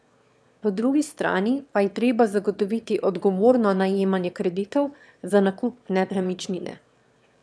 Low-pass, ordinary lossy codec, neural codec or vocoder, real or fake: none; none; autoencoder, 22.05 kHz, a latent of 192 numbers a frame, VITS, trained on one speaker; fake